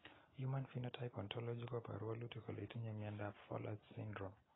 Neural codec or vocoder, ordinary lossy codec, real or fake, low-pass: none; AAC, 16 kbps; real; 7.2 kHz